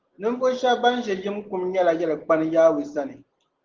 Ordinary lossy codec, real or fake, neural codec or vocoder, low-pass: Opus, 16 kbps; real; none; 7.2 kHz